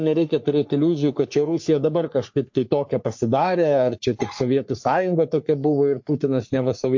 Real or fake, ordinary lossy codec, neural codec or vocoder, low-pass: fake; MP3, 48 kbps; codec, 44.1 kHz, 3.4 kbps, Pupu-Codec; 7.2 kHz